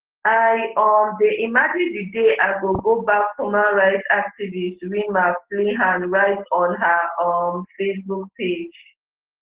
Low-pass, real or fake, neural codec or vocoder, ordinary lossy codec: 3.6 kHz; real; none; Opus, 16 kbps